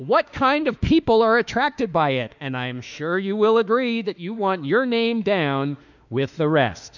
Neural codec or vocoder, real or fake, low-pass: autoencoder, 48 kHz, 32 numbers a frame, DAC-VAE, trained on Japanese speech; fake; 7.2 kHz